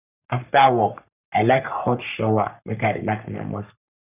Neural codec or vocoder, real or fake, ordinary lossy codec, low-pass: codec, 44.1 kHz, 3.4 kbps, Pupu-Codec; fake; none; 3.6 kHz